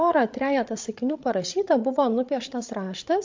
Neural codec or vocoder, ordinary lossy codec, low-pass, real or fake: codec, 16 kHz, 16 kbps, FreqCodec, larger model; MP3, 48 kbps; 7.2 kHz; fake